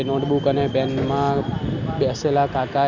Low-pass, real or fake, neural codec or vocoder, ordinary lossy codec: 7.2 kHz; real; none; none